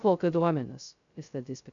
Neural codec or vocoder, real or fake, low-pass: codec, 16 kHz, 0.2 kbps, FocalCodec; fake; 7.2 kHz